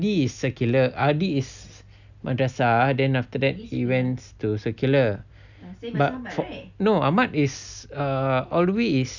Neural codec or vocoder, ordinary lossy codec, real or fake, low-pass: none; none; real; 7.2 kHz